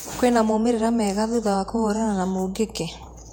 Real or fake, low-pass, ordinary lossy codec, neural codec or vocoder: fake; 19.8 kHz; none; vocoder, 48 kHz, 128 mel bands, Vocos